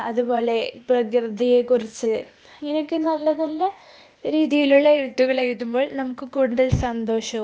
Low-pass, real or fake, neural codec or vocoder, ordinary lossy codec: none; fake; codec, 16 kHz, 0.8 kbps, ZipCodec; none